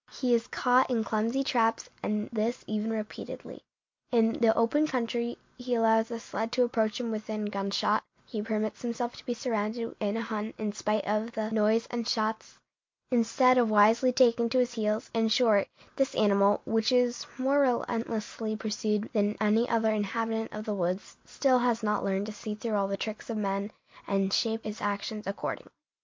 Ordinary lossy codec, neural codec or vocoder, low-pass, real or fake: MP3, 48 kbps; none; 7.2 kHz; real